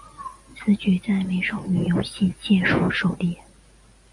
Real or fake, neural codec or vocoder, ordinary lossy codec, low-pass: real; none; MP3, 96 kbps; 10.8 kHz